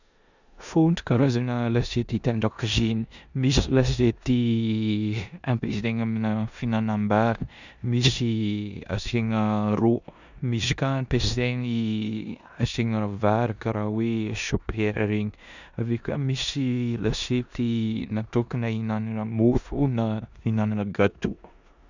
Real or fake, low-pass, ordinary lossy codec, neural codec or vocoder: fake; 7.2 kHz; none; codec, 16 kHz in and 24 kHz out, 0.9 kbps, LongCat-Audio-Codec, four codebook decoder